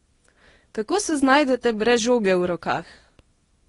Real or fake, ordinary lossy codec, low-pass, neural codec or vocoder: fake; AAC, 32 kbps; 10.8 kHz; codec, 24 kHz, 0.9 kbps, WavTokenizer, small release